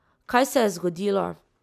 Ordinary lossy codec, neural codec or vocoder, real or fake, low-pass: none; none; real; 14.4 kHz